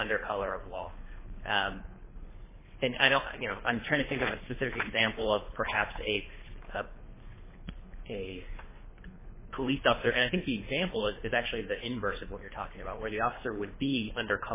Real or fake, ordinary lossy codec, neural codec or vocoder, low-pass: fake; MP3, 16 kbps; codec, 24 kHz, 3 kbps, HILCodec; 3.6 kHz